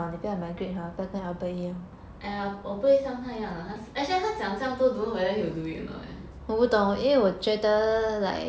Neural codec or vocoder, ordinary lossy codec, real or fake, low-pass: none; none; real; none